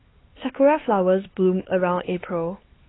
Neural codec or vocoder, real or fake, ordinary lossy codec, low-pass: codec, 16 kHz, 4 kbps, X-Codec, HuBERT features, trained on LibriSpeech; fake; AAC, 16 kbps; 7.2 kHz